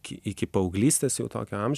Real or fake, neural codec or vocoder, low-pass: real; none; 14.4 kHz